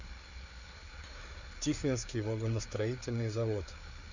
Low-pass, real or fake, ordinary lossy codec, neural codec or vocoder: 7.2 kHz; fake; none; codec, 16 kHz, 4 kbps, FreqCodec, larger model